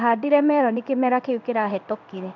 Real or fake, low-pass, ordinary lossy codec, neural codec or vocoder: fake; 7.2 kHz; none; codec, 16 kHz in and 24 kHz out, 1 kbps, XY-Tokenizer